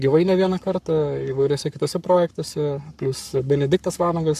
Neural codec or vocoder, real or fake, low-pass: codec, 44.1 kHz, 7.8 kbps, Pupu-Codec; fake; 14.4 kHz